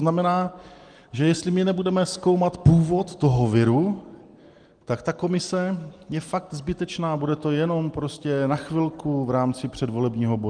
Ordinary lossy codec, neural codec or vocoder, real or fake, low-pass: Opus, 24 kbps; none; real; 9.9 kHz